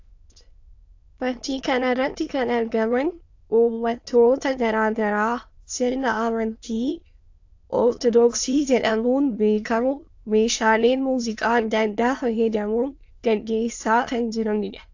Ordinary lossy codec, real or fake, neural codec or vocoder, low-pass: AAC, 48 kbps; fake; autoencoder, 22.05 kHz, a latent of 192 numbers a frame, VITS, trained on many speakers; 7.2 kHz